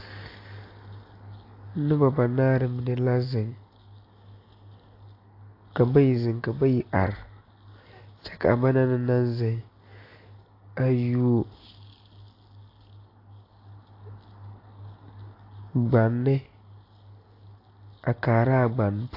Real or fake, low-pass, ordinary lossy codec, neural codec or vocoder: real; 5.4 kHz; AAC, 32 kbps; none